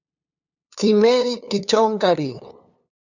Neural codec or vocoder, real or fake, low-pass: codec, 16 kHz, 2 kbps, FunCodec, trained on LibriTTS, 25 frames a second; fake; 7.2 kHz